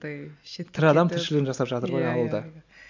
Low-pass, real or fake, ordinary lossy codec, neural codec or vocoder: 7.2 kHz; real; MP3, 64 kbps; none